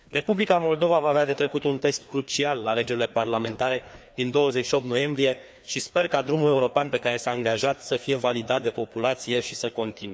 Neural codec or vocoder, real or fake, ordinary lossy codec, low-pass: codec, 16 kHz, 2 kbps, FreqCodec, larger model; fake; none; none